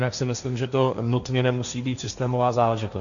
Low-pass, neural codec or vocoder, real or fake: 7.2 kHz; codec, 16 kHz, 1.1 kbps, Voila-Tokenizer; fake